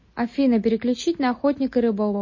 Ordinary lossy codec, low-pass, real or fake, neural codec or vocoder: MP3, 32 kbps; 7.2 kHz; real; none